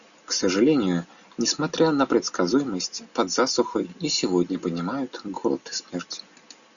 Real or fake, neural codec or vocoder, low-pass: real; none; 7.2 kHz